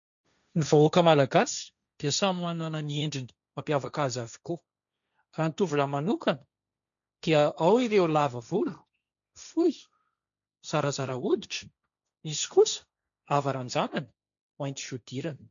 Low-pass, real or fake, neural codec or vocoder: 7.2 kHz; fake; codec, 16 kHz, 1.1 kbps, Voila-Tokenizer